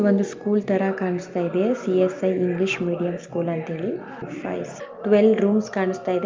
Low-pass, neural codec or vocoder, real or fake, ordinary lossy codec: 7.2 kHz; none; real; Opus, 24 kbps